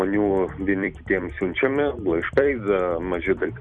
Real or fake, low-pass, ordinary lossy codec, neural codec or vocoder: fake; 9.9 kHz; Opus, 64 kbps; vocoder, 44.1 kHz, 128 mel bands every 256 samples, BigVGAN v2